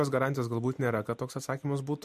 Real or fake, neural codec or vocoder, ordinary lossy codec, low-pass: real; none; MP3, 64 kbps; 14.4 kHz